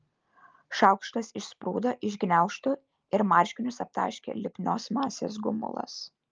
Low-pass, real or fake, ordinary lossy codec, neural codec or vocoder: 7.2 kHz; real; Opus, 24 kbps; none